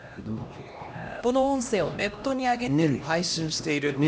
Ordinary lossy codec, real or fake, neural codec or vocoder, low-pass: none; fake; codec, 16 kHz, 1 kbps, X-Codec, HuBERT features, trained on LibriSpeech; none